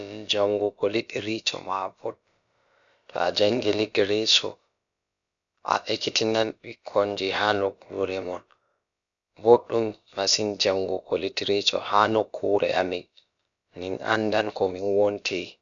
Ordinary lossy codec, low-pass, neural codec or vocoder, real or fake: none; 7.2 kHz; codec, 16 kHz, about 1 kbps, DyCAST, with the encoder's durations; fake